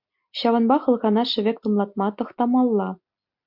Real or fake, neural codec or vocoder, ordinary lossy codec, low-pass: real; none; MP3, 48 kbps; 5.4 kHz